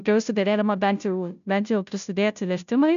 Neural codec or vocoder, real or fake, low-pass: codec, 16 kHz, 0.5 kbps, FunCodec, trained on Chinese and English, 25 frames a second; fake; 7.2 kHz